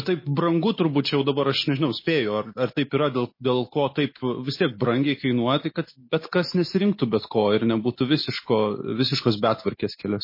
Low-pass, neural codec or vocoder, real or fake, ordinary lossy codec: 5.4 kHz; none; real; MP3, 24 kbps